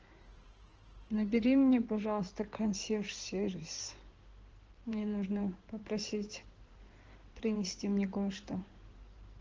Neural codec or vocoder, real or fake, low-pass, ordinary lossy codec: codec, 16 kHz in and 24 kHz out, 2.2 kbps, FireRedTTS-2 codec; fake; 7.2 kHz; Opus, 16 kbps